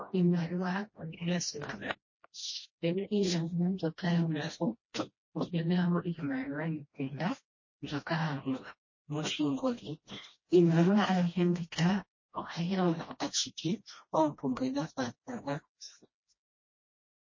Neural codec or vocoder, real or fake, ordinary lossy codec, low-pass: codec, 16 kHz, 1 kbps, FreqCodec, smaller model; fake; MP3, 32 kbps; 7.2 kHz